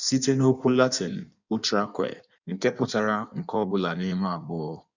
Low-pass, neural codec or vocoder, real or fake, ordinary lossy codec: 7.2 kHz; codec, 16 kHz in and 24 kHz out, 1.1 kbps, FireRedTTS-2 codec; fake; none